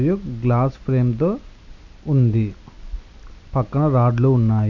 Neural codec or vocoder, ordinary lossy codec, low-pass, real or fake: none; none; 7.2 kHz; real